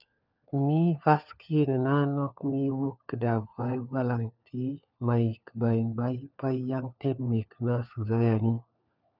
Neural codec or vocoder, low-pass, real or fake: codec, 16 kHz, 4 kbps, FunCodec, trained on LibriTTS, 50 frames a second; 5.4 kHz; fake